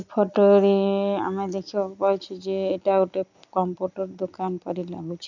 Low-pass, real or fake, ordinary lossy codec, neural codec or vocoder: 7.2 kHz; real; none; none